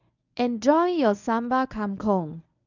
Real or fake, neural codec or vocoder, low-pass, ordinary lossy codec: fake; codec, 24 kHz, 0.9 kbps, WavTokenizer, medium speech release version 1; 7.2 kHz; none